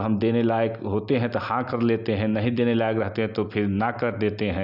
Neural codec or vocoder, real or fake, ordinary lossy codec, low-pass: none; real; none; 5.4 kHz